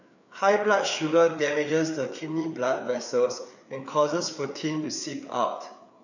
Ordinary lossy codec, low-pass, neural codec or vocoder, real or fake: none; 7.2 kHz; codec, 16 kHz, 4 kbps, FreqCodec, larger model; fake